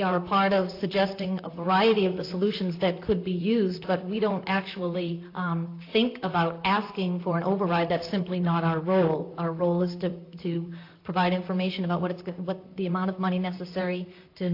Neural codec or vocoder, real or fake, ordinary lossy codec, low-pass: vocoder, 44.1 kHz, 128 mel bands, Pupu-Vocoder; fake; AAC, 32 kbps; 5.4 kHz